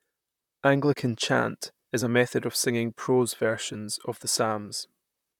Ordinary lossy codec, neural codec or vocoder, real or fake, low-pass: none; vocoder, 44.1 kHz, 128 mel bands, Pupu-Vocoder; fake; 19.8 kHz